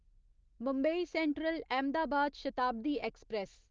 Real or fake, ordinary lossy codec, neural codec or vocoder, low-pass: real; Opus, 24 kbps; none; 7.2 kHz